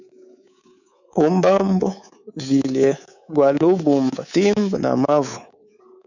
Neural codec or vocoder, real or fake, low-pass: codec, 24 kHz, 3.1 kbps, DualCodec; fake; 7.2 kHz